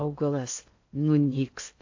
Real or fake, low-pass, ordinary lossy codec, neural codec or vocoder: fake; 7.2 kHz; AAC, 48 kbps; codec, 16 kHz in and 24 kHz out, 0.6 kbps, FocalCodec, streaming, 4096 codes